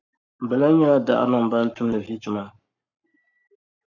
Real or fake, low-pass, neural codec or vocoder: fake; 7.2 kHz; codec, 44.1 kHz, 7.8 kbps, Pupu-Codec